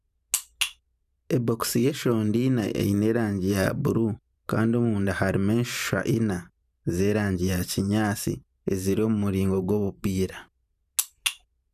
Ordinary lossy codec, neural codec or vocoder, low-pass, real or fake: none; vocoder, 44.1 kHz, 128 mel bands every 512 samples, BigVGAN v2; 14.4 kHz; fake